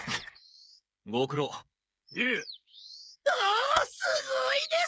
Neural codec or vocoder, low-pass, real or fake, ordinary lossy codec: codec, 16 kHz, 8 kbps, FreqCodec, smaller model; none; fake; none